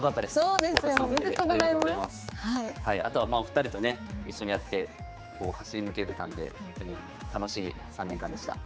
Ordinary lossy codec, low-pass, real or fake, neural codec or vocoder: none; none; fake; codec, 16 kHz, 4 kbps, X-Codec, HuBERT features, trained on general audio